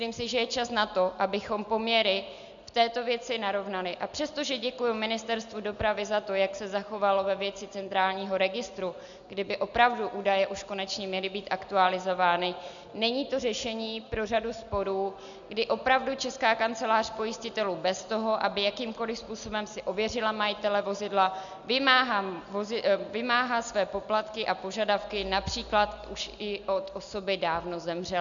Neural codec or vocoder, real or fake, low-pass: none; real; 7.2 kHz